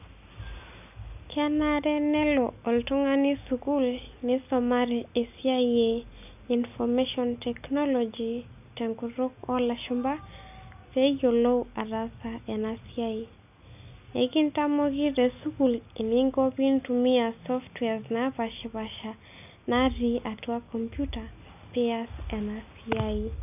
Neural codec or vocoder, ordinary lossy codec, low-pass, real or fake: none; none; 3.6 kHz; real